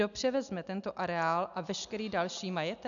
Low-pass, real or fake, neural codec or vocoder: 7.2 kHz; real; none